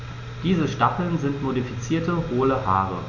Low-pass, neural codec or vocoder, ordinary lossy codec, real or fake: 7.2 kHz; none; none; real